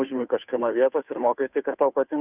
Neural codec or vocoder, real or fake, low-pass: codec, 16 kHz, 2 kbps, FunCodec, trained on Chinese and English, 25 frames a second; fake; 3.6 kHz